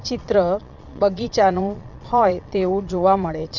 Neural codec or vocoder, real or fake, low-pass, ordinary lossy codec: vocoder, 22.05 kHz, 80 mel bands, Vocos; fake; 7.2 kHz; none